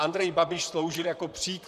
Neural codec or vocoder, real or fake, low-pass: vocoder, 44.1 kHz, 128 mel bands, Pupu-Vocoder; fake; 14.4 kHz